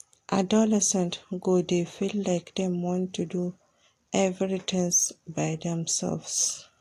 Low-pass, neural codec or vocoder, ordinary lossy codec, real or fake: 14.4 kHz; none; AAC, 64 kbps; real